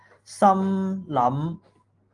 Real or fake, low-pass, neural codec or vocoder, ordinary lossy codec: real; 10.8 kHz; none; Opus, 24 kbps